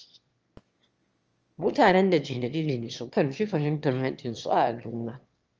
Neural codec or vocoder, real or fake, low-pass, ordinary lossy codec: autoencoder, 22.05 kHz, a latent of 192 numbers a frame, VITS, trained on one speaker; fake; 7.2 kHz; Opus, 24 kbps